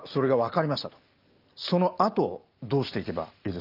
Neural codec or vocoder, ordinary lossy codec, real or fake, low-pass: none; Opus, 24 kbps; real; 5.4 kHz